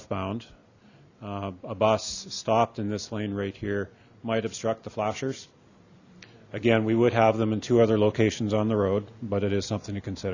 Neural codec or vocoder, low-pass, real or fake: none; 7.2 kHz; real